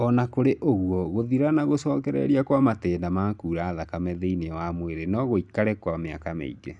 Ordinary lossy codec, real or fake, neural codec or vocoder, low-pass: none; real; none; 10.8 kHz